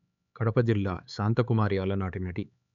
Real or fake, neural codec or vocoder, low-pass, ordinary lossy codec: fake; codec, 16 kHz, 4 kbps, X-Codec, HuBERT features, trained on LibriSpeech; 7.2 kHz; none